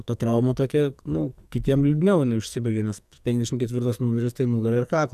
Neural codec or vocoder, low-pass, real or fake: codec, 44.1 kHz, 2.6 kbps, SNAC; 14.4 kHz; fake